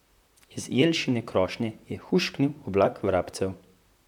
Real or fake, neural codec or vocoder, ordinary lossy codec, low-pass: fake; vocoder, 44.1 kHz, 128 mel bands, Pupu-Vocoder; none; 19.8 kHz